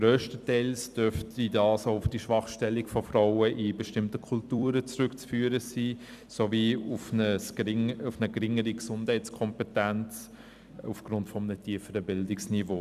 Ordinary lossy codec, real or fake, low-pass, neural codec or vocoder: none; fake; 14.4 kHz; vocoder, 44.1 kHz, 128 mel bands every 256 samples, BigVGAN v2